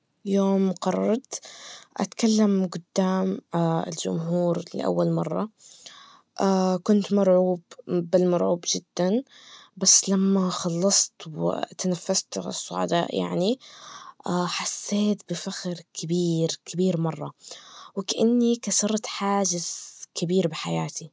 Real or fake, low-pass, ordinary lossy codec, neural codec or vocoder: real; none; none; none